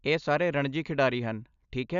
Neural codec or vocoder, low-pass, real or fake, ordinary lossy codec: none; 7.2 kHz; real; none